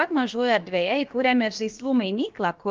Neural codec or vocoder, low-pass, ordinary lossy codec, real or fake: codec, 16 kHz, about 1 kbps, DyCAST, with the encoder's durations; 7.2 kHz; Opus, 24 kbps; fake